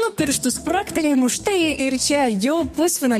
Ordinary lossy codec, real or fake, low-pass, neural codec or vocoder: MP3, 64 kbps; fake; 14.4 kHz; codec, 32 kHz, 1.9 kbps, SNAC